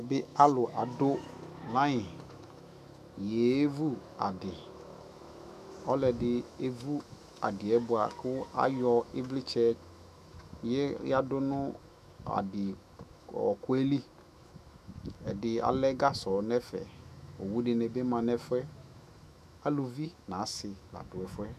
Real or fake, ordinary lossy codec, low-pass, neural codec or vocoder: fake; AAC, 96 kbps; 14.4 kHz; autoencoder, 48 kHz, 128 numbers a frame, DAC-VAE, trained on Japanese speech